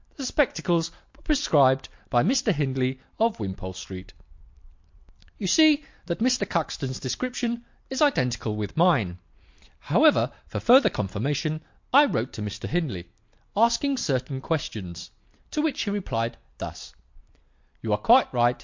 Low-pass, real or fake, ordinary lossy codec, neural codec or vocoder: 7.2 kHz; real; MP3, 48 kbps; none